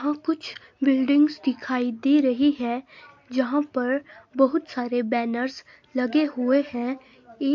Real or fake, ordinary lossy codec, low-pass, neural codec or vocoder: real; MP3, 48 kbps; 7.2 kHz; none